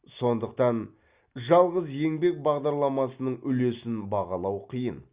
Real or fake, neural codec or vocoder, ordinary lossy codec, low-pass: real; none; Opus, 64 kbps; 3.6 kHz